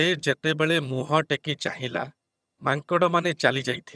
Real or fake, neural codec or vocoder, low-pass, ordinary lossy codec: fake; vocoder, 22.05 kHz, 80 mel bands, HiFi-GAN; none; none